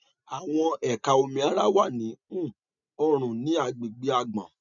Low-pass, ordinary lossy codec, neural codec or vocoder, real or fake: 7.2 kHz; none; none; real